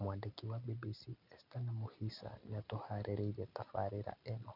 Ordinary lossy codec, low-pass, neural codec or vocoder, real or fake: none; 5.4 kHz; none; real